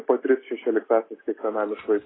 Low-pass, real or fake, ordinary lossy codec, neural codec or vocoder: 7.2 kHz; real; AAC, 16 kbps; none